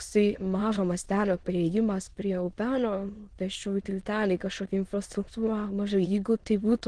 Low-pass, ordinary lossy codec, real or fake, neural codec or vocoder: 9.9 kHz; Opus, 16 kbps; fake; autoencoder, 22.05 kHz, a latent of 192 numbers a frame, VITS, trained on many speakers